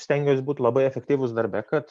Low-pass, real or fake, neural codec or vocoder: 10.8 kHz; real; none